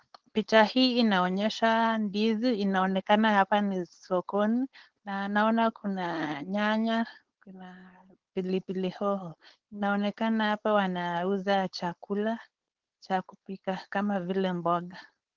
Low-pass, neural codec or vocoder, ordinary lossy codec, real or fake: 7.2 kHz; codec, 16 kHz, 4.8 kbps, FACodec; Opus, 16 kbps; fake